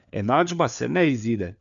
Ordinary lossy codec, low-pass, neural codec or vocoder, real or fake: none; 7.2 kHz; codec, 16 kHz, 4 kbps, FunCodec, trained on LibriTTS, 50 frames a second; fake